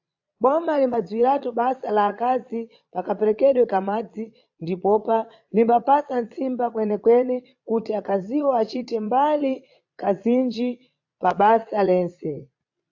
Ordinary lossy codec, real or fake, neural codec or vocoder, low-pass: AAC, 48 kbps; fake; vocoder, 22.05 kHz, 80 mel bands, Vocos; 7.2 kHz